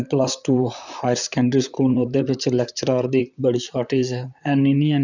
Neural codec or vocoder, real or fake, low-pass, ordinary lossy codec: vocoder, 44.1 kHz, 128 mel bands, Pupu-Vocoder; fake; 7.2 kHz; none